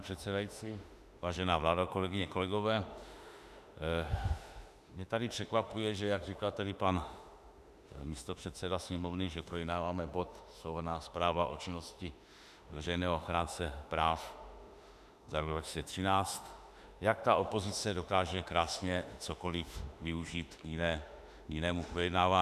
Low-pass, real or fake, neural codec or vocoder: 14.4 kHz; fake; autoencoder, 48 kHz, 32 numbers a frame, DAC-VAE, trained on Japanese speech